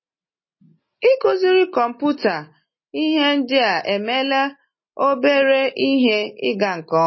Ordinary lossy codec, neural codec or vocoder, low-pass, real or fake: MP3, 24 kbps; none; 7.2 kHz; real